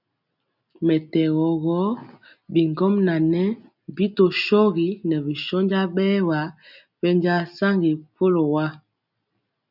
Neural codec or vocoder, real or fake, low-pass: none; real; 5.4 kHz